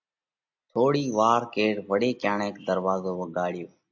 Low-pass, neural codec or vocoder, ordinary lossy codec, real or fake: 7.2 kHz; none; AAC, 48 kbps; real